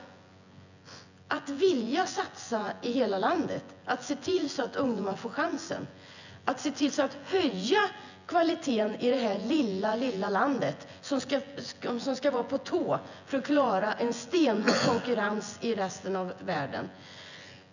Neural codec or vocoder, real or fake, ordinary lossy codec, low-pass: vocoder, 24 kHz, 100 mel bands, Vocos; fake; none; 7.2 kHz